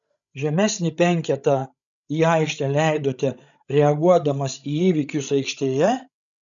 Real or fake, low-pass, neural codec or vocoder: fake; 7.2 kHz; codec, 16 kHz, 16 kbps, FreqCodec, larger model